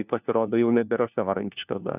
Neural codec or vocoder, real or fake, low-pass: codec, 16 kHz, 1 kbps, FunCodec, trained on LibriTTS, 50 frames a second; fake; 3.6 kHz